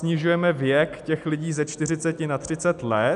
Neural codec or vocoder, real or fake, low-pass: none; real; 10.8 kHz